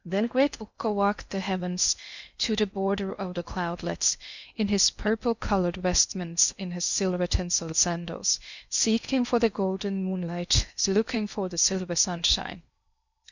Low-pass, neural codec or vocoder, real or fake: 7.2 kHz; codec, 16 kHz in and 24 kHz out, 0.6 kbps, FocalCodec, streaming, 4096 codes; fake